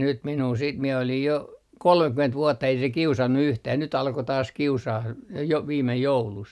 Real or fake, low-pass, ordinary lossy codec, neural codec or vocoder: real; none; none; none